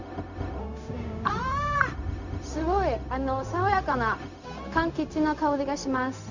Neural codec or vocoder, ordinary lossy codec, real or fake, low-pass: codec, 16 kHz, 0.4 kbps, LongCat-Audio-Codec; none; fake; 7.2 kHz